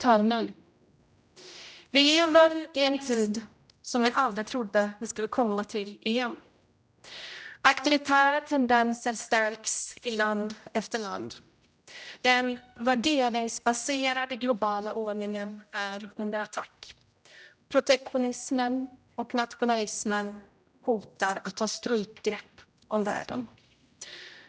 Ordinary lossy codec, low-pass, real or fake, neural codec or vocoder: none; none; fake; codec, 16 kHz, 0.5 kbps, X-Codec, HuBERT features, trained on general audio